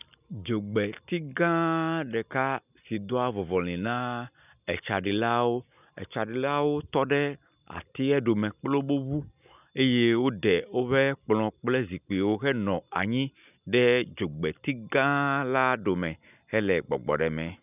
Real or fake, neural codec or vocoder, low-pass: real; none; 3.6 kHz